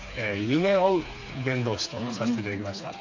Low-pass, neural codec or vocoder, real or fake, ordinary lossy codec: 7.2 kHz; codec, 16 kHz, 4 kbps, FreqCodec, smaller model; fake; AAC, 48 kbps